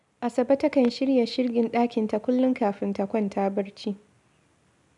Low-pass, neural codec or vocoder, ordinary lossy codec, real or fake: 10.8 kHz; none; none; real